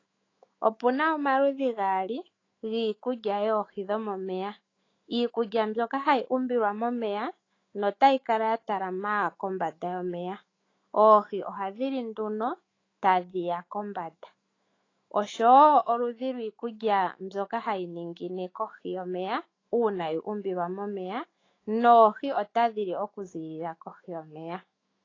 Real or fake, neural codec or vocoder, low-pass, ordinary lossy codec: fake; autoencoder, 48 kHz, 128 numbers a frame, DAC-VAE, trained on Japanese speech; 7.2 kHz; AAC, 32 kbps